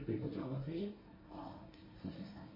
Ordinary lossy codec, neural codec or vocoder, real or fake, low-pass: MP3, 24 kbps; codec, 24 kHz, 1 kbps, SNAC; fake; 5.4 kHz